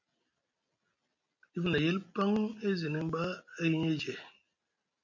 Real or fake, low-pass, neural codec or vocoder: real; 7.2 kHz; none